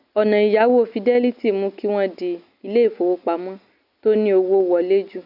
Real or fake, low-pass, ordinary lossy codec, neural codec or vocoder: real; 5.4 kHz; none; none